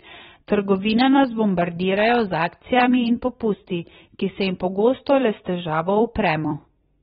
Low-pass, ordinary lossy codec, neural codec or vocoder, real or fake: 19.8 kHz; AAC, 16 kbps; vocoder, 44.1 kHz, 128 mel bands every 256 samples, BigVGAN v2; fake